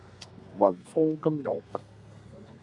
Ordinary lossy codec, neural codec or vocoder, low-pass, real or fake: MP3, 96 kbps; codec, 32 kHz, 1.9 kbps, SNAC; 10.8 kHz; fake